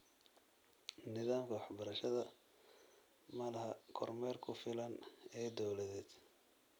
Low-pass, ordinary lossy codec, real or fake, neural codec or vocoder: none; none; real; none